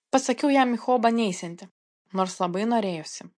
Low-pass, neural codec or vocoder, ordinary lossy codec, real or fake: 9.9 kHz; none; MP3, 48 kbps; real